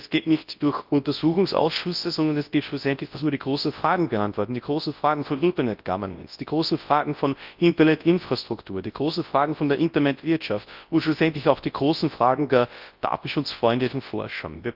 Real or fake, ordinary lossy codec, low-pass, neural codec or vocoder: fake; Opus, 24 kbps; 5.4 kHz; codec, 24 kHz, 0.9 kbps, WavTokenizer, large speech release